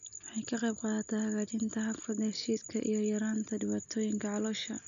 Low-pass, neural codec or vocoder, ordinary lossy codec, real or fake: 7.2 kHz; none; none; real